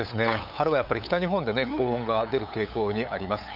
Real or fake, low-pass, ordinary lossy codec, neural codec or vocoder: fake; 5.4 kHz; none; codec, 16 kHz, 8 kbps, FunCodec, trained on LibriTTS, 25 frames a second